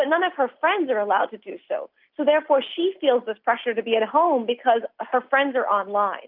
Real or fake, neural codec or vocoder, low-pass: real; none; 5.4 kHz